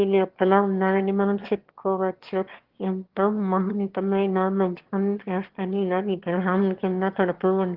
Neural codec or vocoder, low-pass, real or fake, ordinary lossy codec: autoencoder, 22.05 kHz, a latent of 192 numbers a frame, VITS, trained on one speaker; 5.4 kHz; fake; Opus, 16 kbps